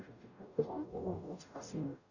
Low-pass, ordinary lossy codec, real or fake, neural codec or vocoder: 7.2 kHz; none; fake; codec, 44.1 kHz, 0.9 kbps, DAC